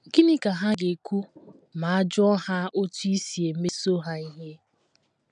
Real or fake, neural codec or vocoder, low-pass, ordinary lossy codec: real; none; 9.9 kHz; none